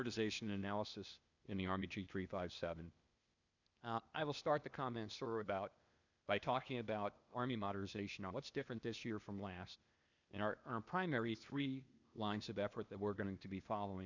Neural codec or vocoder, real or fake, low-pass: codec, 16 kHz, 0.8 kbps, ZipCodec; fake; 7.2 kHz